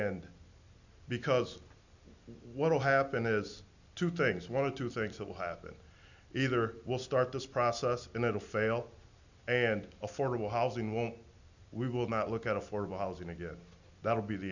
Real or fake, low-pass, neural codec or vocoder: real; 7.2 kHz; none